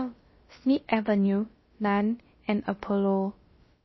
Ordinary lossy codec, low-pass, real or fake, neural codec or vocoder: MP3, 24 kbps; 7.2 kHz; fake; codec, 16 kHz, about 1 kbps, DyCAST, with the encoder's durations